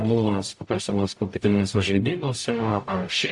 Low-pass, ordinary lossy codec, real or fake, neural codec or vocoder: 10.8 kHz; AAC, 64 kbps; fake; codec, 44.1 kHz, 0.9 kbps, DAC